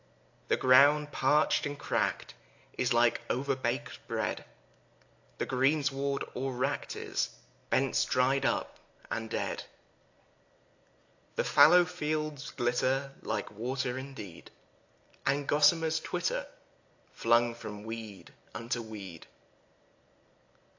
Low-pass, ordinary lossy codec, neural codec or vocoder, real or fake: 7.2 kHz; AAC, 48 kbps; none; real